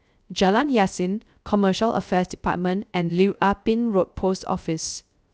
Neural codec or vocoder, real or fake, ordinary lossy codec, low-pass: codec, 16 kHz, 0.3 kbps, FocalCodec; fake; none; none